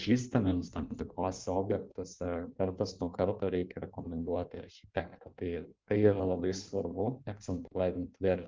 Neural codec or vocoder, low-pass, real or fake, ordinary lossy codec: codec, 16 kHz in and 24 kHz out, 1.1 kbps, FireRedTTS-2 codec; 7.2 kHz; fake; Opus, 32 kbps